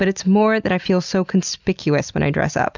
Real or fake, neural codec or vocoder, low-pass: real; none; 7.2 kHz